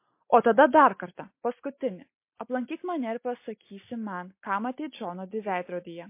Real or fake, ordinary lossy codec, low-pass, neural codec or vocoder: real; MP3, 24 kbps; 3.6 kHz; none